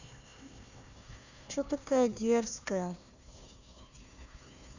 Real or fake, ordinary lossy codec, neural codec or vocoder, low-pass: fake; none; codec, 16 kHz, 1 kbps, FunCodec, trained on Chinese and English, 50 frames a second; 7.2 kHz